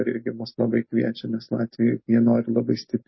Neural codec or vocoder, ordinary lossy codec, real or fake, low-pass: none; MP3, 24 kbps; real; 7.2 kHz